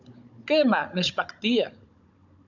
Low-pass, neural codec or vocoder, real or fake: 7.2 kHz; codec, 16 kHz, 16 kbps, FunCodec, trained on Chinese and English, 50 frames a second; fake